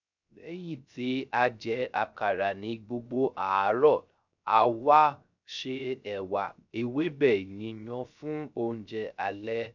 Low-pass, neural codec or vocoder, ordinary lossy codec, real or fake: 7.2 kHz; codec, 16 kHz, 0.3 kbps, FocalCodec; none; fake